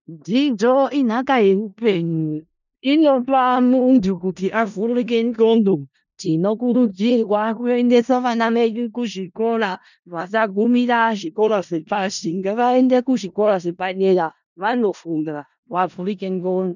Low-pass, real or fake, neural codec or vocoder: 7.2 kHz; fake; codec, 16 kHz in and 24 kHz out, 0.4 kbps, LongCat-Audio-Codec, four codebook decoder